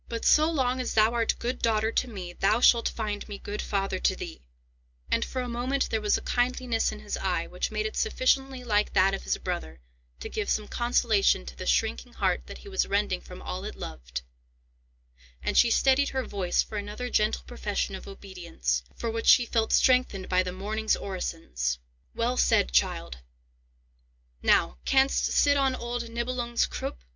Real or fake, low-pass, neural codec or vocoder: real; 7.2 kHz; none